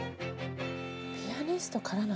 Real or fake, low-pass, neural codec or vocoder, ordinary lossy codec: real; none; none; none